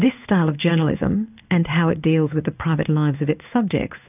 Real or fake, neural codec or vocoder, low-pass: fake; codec, 16 kHz in and 24 kHz out, 1 kbps, XY-Tokenizer; 3.6 kHz